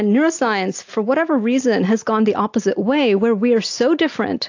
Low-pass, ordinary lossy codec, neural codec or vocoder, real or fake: 7.2 kHz; AAC, 48 kbps; none; real